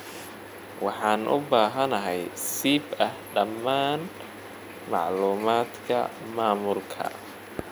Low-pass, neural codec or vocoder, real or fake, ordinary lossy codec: none; none; real; none